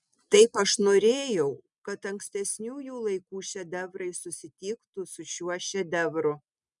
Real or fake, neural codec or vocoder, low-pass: real; none; 10.8 kHz